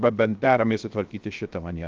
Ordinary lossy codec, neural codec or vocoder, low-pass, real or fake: Opus, 24 kbps; codec, 16 kHz, 0.7 kbps, FocalCodec; 7.2 kHz; fake